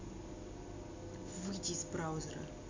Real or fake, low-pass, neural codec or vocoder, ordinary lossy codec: real; 7.2 kHz; none; MP3, 64 kbps